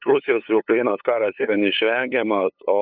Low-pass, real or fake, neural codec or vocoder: 5.4 kHz; fake; codec, 16 kHz, 8 kbps, FunCodec, trained on LibriTTS, 25 frames a second